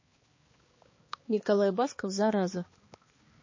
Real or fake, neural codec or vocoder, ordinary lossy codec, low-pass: fake; codec, 16 kHz, 2 kbps, X-Codec, HuBERT features, trained on balanced general audio; MP3, 32 kbps; 7.2 kHz